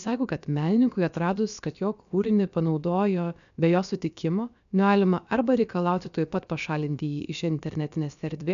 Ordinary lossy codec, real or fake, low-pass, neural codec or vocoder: MP3, 96 kbps; fake; 7.2 kHz; codec, 16 kHz, about 1 kbps, DyCAST, with the encoder's durations